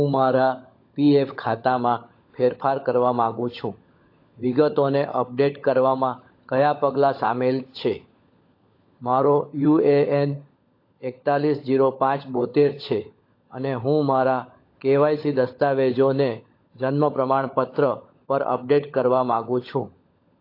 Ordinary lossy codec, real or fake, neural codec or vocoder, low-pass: AAC, 32 kbps; fake; codec, 16 kHz, 16 kbps, FunCodec, trained on LibriTTS, 50 frames a second; 5.4 kHz